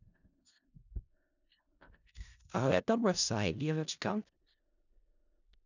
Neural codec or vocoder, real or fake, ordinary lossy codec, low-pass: codec, 16 kHz in and 24 kHz out, 0.4 kbps, LongCat-Audio-Codec, four codebook decoder; fake; none; 7.2 kHz